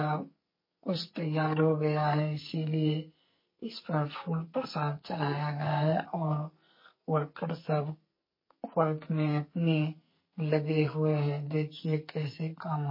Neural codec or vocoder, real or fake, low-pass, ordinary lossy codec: codec, 44.1 kHz, 2.6 kbps, SNAC; fake; 5.4 kHz; MP3, 24 kbps